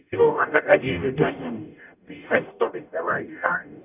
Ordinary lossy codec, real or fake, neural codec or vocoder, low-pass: none; fake; codec, 44.1 kHz, 0.9 kbps, DAC; 3.6 kHz